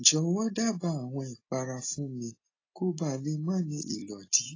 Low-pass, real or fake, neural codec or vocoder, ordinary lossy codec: 7.2 kHz; real; none; AAC, 32 kbps